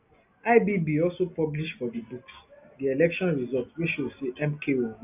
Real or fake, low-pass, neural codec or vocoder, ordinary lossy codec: real; 3.6 kHz; none; none